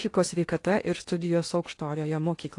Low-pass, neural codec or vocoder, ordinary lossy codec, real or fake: 10.8 kHz; codec, 16 kHz in and 24 kHz out, 0.8 kbps, FocalCodec, streaming, 65536 codes; AAC, 48 kbps; fake